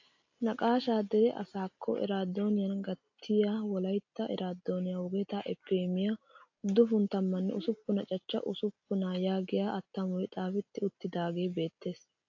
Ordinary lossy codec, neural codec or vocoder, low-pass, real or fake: AAC, 48 kbps; none; 7.2 kHz; real